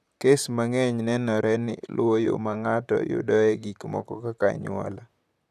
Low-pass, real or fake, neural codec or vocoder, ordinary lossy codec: 14.4 kHz; fake; vocoder, 44.1 kHz, 128 mel bands, Pupu-Vocoder; none